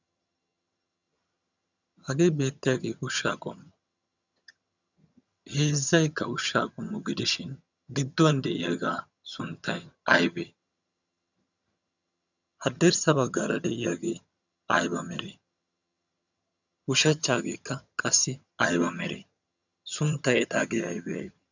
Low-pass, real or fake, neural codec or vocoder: 7.2 kHz; fake; vocoder, 22.05 kHz, 80 mel bands, HiFi-GAN